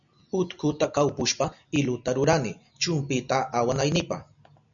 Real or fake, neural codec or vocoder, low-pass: real; none; 7.2 kHz